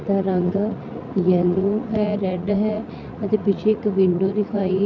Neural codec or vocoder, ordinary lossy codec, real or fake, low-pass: vocoder, 44.1 kHz, 80 mel bands, Vocos; MP3, 64 kbps; fake; 7.2 kHz